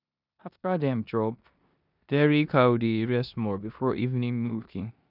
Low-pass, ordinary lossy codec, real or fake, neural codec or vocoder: 5.4 kHz; none; fake; codec, 16 kHz in and 24 kHz out, 0.9 kbps, LongCat-Audio-Codec, four codebook decoder